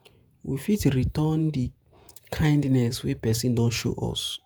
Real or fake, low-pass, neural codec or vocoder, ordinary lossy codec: fake; none; vocoder, 48 kHz, 128 mel bands, Vocos; none